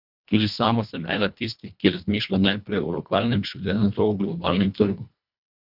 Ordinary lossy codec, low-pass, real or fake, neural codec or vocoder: none; 5.4 kHz; fake; codec, 24 kHz, 1.5 kbps, HILCodec